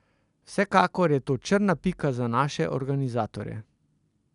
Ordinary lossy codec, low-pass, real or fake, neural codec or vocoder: none; 10.8 kHz; real; none